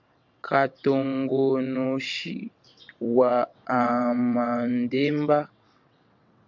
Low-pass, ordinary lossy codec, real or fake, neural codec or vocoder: 7.2 kHz; MP3, 64 kbps; fake; vocoder, 22.05 kHz, 80 mel bands, WaveNeXt